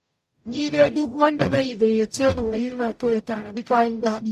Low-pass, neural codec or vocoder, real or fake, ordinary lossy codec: 14.4 kHz; codec, 44.1 kHz, 0.9 kbps, DAC; fake; AAC, 96 kbps